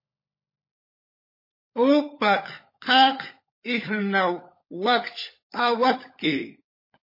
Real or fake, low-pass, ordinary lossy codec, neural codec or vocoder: fake; 5.4 kHz; MP3, 24 kbps; codec, 16 kHz, 16 kbps, FunCodec, trained on LibriTTS, 50 frames a second